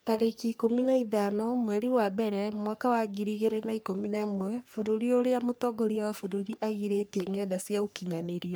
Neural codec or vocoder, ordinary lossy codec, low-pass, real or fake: codec, 44.1 kHz, 3.4 kbps, Pupu-Codec; none; none; fake